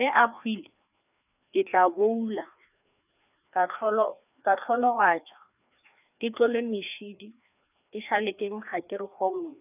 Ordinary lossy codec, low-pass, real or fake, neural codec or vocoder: none; 3.6 kHz; fake; codec, 16 kHz, 2 kbps, FreqCodec, larger model